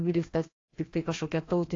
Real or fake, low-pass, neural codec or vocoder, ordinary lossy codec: fake; 7.2 kHz; codec, 16 kHz, 1 kbps, FreqCodec, larger model; AAC, 32 kbps